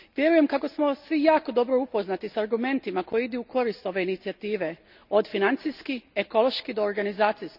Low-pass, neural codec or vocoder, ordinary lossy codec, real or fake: 5.4 kHz; none; none; real